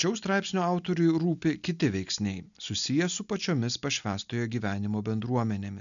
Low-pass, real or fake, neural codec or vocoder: 7.2 kHz; real; none